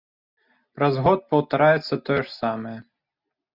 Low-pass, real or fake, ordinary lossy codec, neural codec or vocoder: 5.4 kHz; real; Opus, 64 kbps; none